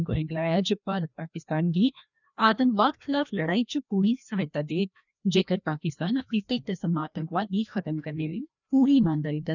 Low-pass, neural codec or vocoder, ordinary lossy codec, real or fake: 7.2 kHz; codec, 16 kHz, 1 kbps, FreqCodec, larger model; none; fake